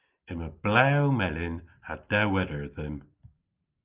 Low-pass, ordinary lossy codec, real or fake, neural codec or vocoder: 3.6 kHz; Opus, 24 kbps; real; none